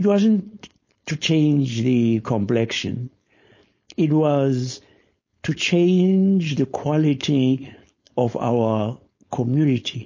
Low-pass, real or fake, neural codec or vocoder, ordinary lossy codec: 7.2 kHz; fake; codec, 16 kHz, 4.8 kbps, FACodec; MP3, 32 kbps